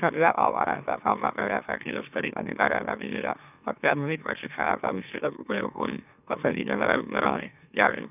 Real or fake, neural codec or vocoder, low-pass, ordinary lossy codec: fake; autoencoder, 44.1 kHz, a latent of 192 numbers a frame, MeloTTS; 3.6 kHz; none